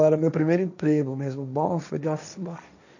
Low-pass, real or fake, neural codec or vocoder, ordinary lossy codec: 7.2 kHz; fake; codec, 16 kHz, 1.1 kbps, Voila-Tokenizer; none